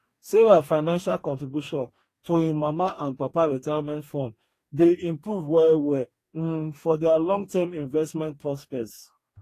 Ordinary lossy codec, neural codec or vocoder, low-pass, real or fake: AAC, 48 kbps; codec, 44.1 kHz, 2.6 kbps, DAC; 14.4 kHz; fake